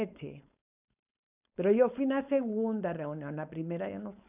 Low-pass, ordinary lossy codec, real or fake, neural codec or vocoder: 3.6 kHz; none; fake; codec, 16 kHz, 4.8 kbps, FACodec